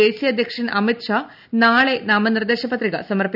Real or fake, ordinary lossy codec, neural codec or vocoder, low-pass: real; none; none; 5.4 kHz